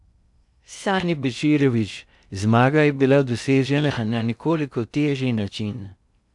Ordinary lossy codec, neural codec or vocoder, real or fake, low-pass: none; codec, 16 kHz in and 24 kHz out, 0.6 kbps, FocalCodec, streaming, 2048 codes; fake; 10.8 kHz